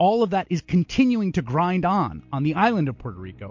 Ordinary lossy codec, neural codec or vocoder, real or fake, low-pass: MP3, 48 kbps; none; real; 7.2 kHz